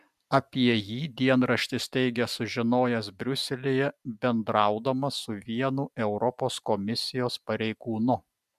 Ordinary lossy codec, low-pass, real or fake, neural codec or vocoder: MP3, 96 kbps; 14.4 kHz; real; none